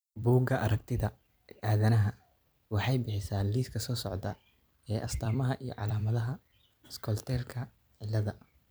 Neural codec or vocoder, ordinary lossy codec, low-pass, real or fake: vocoder, 44.1 kHz, 128 mel bands every 256 samples, BigVGAN v2; none; none; fake